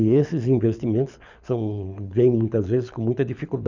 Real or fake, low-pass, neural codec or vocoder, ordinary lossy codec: fake; 7.2 kHz; codec, 24 kHz, 6 kbps, HILCodec; none